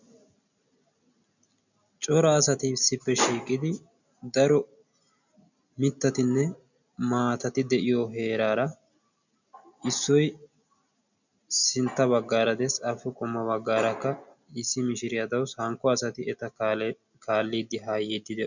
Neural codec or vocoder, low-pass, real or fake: none; 7.2 kHz; real